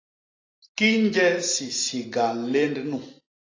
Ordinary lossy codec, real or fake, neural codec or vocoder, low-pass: AAC, 32 kbps; real; none; 7.2 kHz